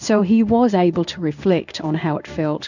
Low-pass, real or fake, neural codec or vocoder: 7.2 kHz; fake; codec, 16 kHz in and 24 kHz out, 1 kbps, XY-Tokenizer